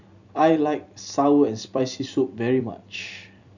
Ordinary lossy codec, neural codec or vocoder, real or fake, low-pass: none; vocoder, 44.1 kHz, 128 mel bands every 256 samples, BigVGAN v2; fake; 7.2 kHz